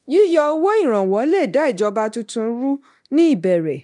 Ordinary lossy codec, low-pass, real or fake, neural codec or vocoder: none; 10.8 kHz; fake; codec, 24 kHz, 0.9 kbps, DualCodec